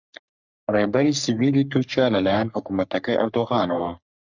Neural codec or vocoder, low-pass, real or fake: codec, 44.1 kHz, 3.4 kbps, Pupu-Codec; 7.2 kHz; fake